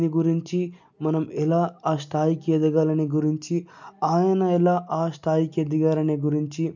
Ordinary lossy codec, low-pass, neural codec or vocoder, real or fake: none; 7.2 kHz; none; real